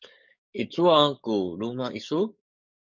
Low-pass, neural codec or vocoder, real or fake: 7.2 kHz; codec, 44.1 kHz, 7.8 kbps, DAC; fake